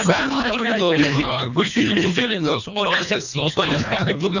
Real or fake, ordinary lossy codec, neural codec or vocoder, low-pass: fake; none; codec, 24 kHz, 1.5 kbps, HILCodec; 7.2 kHz